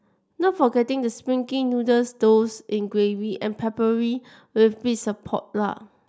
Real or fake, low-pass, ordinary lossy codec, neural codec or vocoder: real; none; none; none